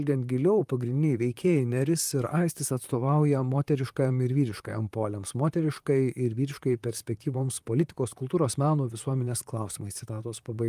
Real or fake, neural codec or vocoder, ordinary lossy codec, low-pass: fake; vocoder, 44.1 kHz, 128 mel bands, Pupu-Vocoder; Opus, 32 kbps; 14.4 kHz